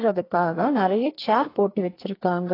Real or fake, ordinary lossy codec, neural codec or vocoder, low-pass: fake; AAC, 24 kbps; codec, 44.1 kHz, 2.6 kbps, SNAC; 5.4 kHz